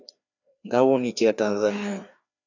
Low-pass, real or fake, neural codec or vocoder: 7.2 kHz; fake; codec, 16 kHz, 2 kbps, FreqCodec, larger model